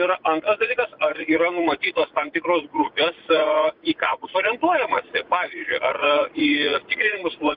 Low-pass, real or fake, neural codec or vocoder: 5.4 kHz; fake; vocoder, 44.1 kHz, 80 mel bands, Vocos